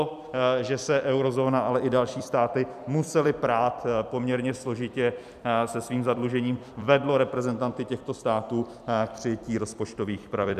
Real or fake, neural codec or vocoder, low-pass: fake; vocoder, 44.1 kHz, 128 mel bands every 256 samples, BigVGAN v2; 14.4 kHz